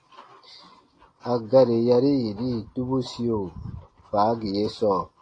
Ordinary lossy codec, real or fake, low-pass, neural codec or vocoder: AAC, 32 kbps; real; 9.9 kHz; none